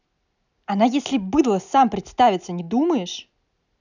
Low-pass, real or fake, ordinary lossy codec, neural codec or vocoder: 7.2 kHz; real; none; none